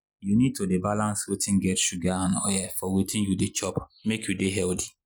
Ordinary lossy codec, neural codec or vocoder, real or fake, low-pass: none; none; real; none